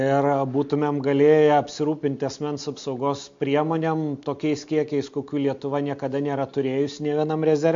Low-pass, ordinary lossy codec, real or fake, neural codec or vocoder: 7.2 kHz; MP3, 48 kbps; real; none